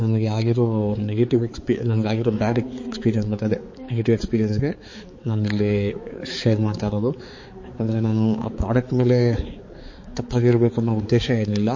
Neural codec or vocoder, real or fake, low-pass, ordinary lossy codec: codec, 16 kHz, 4 kbps, X-Codec, HuBERT features, trained on balanced general audio; fake; 7.2 kHz; MP3, 32 kbps